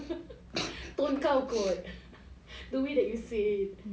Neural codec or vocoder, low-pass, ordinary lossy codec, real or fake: none; none; none; real